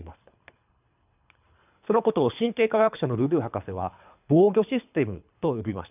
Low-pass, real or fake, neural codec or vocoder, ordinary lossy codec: 3.6 kHz; fake; codec, 24 kHz, 3 kbps, HILCodec; none